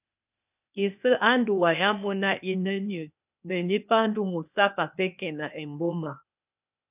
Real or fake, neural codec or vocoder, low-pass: fake; codec, 16 kHz, 0.8 kbps, ZipCodec; 3.6 kHz